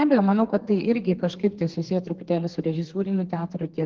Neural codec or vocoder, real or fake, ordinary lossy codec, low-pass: codec, 32 kHz, 1.9 kbps, SNAC; fake; Opus, 16 kbps; 7.2 kHz